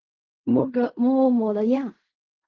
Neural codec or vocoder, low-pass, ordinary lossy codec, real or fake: codec, 16 kHz in and 24 kHz out, 0.4 kbps, LongCat-Audio-Codec, fine tuned four codebook decoder; 7.2 kHz; Opus, 32 kbps; fake